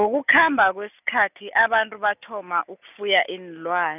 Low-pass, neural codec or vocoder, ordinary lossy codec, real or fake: 3.6 kHz; none; none; real